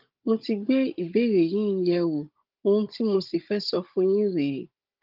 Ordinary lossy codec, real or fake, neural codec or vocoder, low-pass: Opus, 32 kbps; fake; codec, 16 kHz, 16 kbps, FunCodec, trained on Chinese and English, 50 frames a second; 5.4 kHz